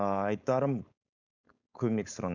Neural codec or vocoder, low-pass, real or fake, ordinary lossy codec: codec, 16 kHz, 4.8 kbps, FACodec; 7.2 kHz; fake; none